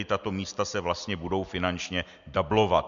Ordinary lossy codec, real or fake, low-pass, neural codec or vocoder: MP3, 64 kbps; real; 7.2 kHz; none